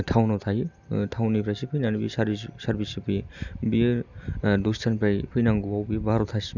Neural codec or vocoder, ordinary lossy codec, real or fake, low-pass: none; none; real; 7.2 kHz